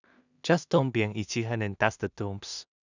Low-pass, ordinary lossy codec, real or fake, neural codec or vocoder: 7.2 kHz; none; fake; codec, 16 kHz in and 24 kHz out, 0.4 kbps, LongCat-Audio-Codec, two codebook decoder